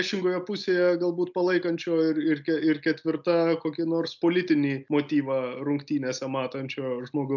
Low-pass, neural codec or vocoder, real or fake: 7.2 kHz; none; real